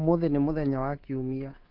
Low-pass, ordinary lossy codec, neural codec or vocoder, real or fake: 5.4 kHz; Opus, 16 kbps; codec, 24 kHz, 3.1 kbps, DualCodec; fake